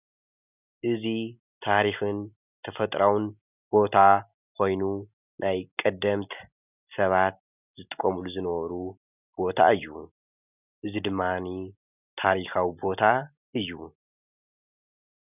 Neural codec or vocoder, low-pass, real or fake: none; 3.6 kHz; real